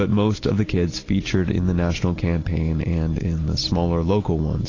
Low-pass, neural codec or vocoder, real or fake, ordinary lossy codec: 7.2 kHz; none; real; AAC, 32 kbps